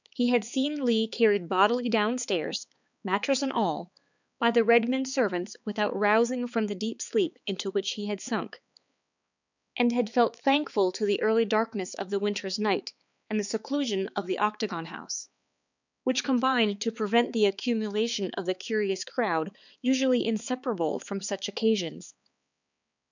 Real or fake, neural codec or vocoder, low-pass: fake; codec, 16 kHz, 4 kbps, X-Codec, HuBERT features, trained on balanced general audio; 7.2 kHz